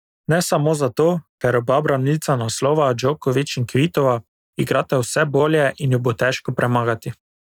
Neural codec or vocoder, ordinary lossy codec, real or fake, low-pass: none; none; real; 19.8 kHz